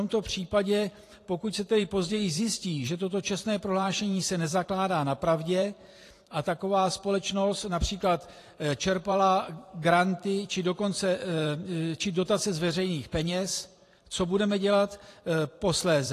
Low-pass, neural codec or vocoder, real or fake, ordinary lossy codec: 14.4 kHz; vocoder, 44.1 kHz, 128 mel bands every 512 samples, BigVGAN v2; fake; AAC, 48 kbps